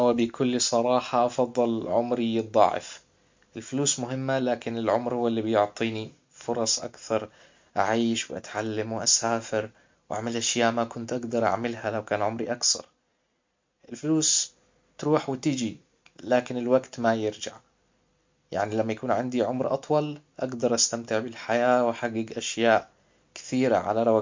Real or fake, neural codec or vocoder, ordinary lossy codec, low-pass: real; none; MP3, 48 kbps; 7.2 kHz